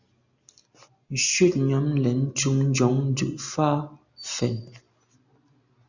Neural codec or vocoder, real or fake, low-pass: none; real; 7.2 kHz